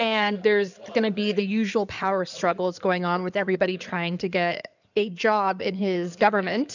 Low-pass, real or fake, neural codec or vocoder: 7.2 kHz; fake; codec, 16 kHz in and 24 kHz out, 2.2 kbps, FireRedTTS-2 codec